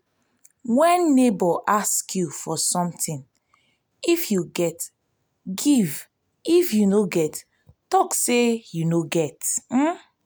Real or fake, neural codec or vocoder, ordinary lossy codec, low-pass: real; none; none; none